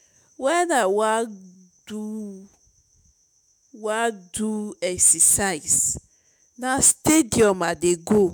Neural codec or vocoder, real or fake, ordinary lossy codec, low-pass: autoencoder, 48 kHz, 128 numbers a frame, DAC-VAE, trained on Japanese speech; fake; none; none